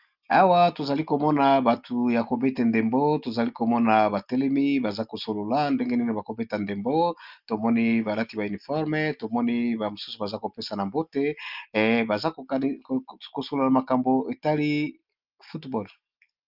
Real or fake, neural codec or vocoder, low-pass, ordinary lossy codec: real; none; 5.4 kHz; Opus, 24 kbps